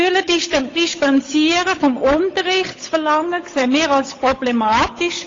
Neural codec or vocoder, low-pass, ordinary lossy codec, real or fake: codec, 16 kHz, 8 kbps, FunCodec, trained on Chinese and English, 25 frames a second; 7.2 kHz; AAC, 32 kbps; fake